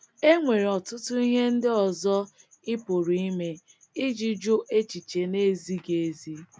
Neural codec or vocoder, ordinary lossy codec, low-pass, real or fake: none; none; none; real